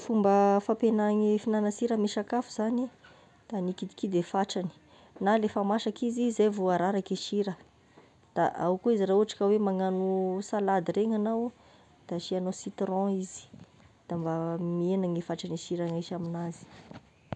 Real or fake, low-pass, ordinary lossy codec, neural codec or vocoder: real; 10.8 kHz; none; none